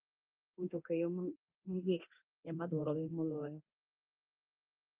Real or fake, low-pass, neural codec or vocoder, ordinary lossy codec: fake; 3.6 kHz; codec, 24 kHz, 0.9 kbps, DualCodec; Opus, 24 kbps